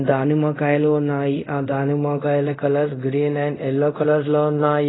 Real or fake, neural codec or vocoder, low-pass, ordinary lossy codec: fake; codec, 24 kHz, 0.5 kbps, DualCodec; 7.2 kHz; AAC, 16 kbps